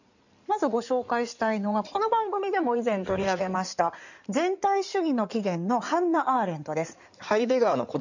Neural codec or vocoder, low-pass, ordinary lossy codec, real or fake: codec, 16 kHz in and 24 kHz out, 2.2 kbps, FireRedTTS-2 codec; 7.2 kHz; none; fake